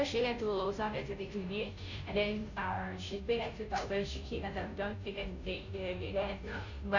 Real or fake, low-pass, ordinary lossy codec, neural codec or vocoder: fake; 7.2 kHz; none; codec, 16 kHz, 0.5 kbps, FunCodec, trained on Chinese and English, 25 frames a second